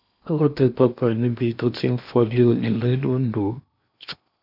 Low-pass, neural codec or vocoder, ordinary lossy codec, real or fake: 5.4 kHz; codec, 16 kHz in and 24 kHz out, 0.8 kbps, FocalCodec, streaming, 65536 codes; none; fake